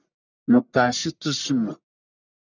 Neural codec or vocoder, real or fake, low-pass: codec, 44.1 kHz, 1.7 kbps, Pupu-Codec; fake; 7.2 kHz